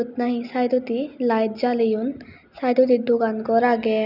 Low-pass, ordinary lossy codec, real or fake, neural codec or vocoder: 5.4 kHz; none; real; none